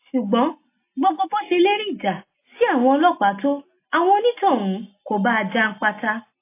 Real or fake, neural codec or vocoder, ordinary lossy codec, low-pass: real; none; AAC, 24 kbps; 3.6 kHz